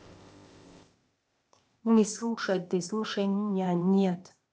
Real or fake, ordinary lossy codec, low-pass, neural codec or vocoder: fake; none; none; codec, 16 kHz, 0.8 kbps, ZipCodec